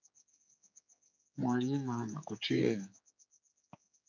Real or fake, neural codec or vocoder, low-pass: fake; codec, 16 kHz, 4 kbps, X-Codec, HuBERT features, trained on general audio; 7.2 kHz